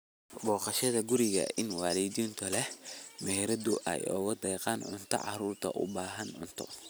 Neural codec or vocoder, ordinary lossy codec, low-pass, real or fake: none; none; none; real